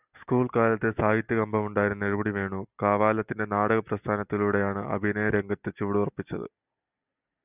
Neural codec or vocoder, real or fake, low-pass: none; real; 3.6 kHz